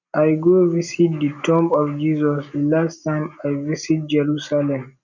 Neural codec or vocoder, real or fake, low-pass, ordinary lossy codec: none; real; 7.2 kHz; none